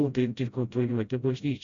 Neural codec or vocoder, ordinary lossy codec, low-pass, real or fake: codec, 16 kHz, 0.5 kbps, FreqCodec, smaller model; Opus, 64 kbps; 7.2 kHz; fake